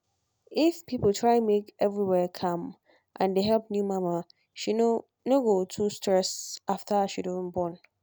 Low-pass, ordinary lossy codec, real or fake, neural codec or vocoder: none; none; real; none